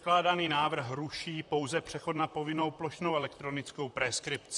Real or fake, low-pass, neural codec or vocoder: fake; 10.8 kHz; vocoder, 44.1 kHz, 128 mel bands, Pupu-Vocoder